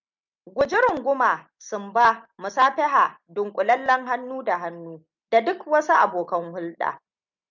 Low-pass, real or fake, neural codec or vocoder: 7.2 kHz; real; none